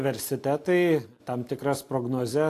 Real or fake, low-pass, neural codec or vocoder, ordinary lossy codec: real; 14.4 kHz; none; AAC, 64 kbps